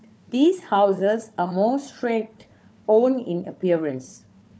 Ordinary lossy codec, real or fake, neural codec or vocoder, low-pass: none; fake; codec, 16 kHz, 4 kbps, FunCodec, trained on Chinese and English, 50 frames a second; none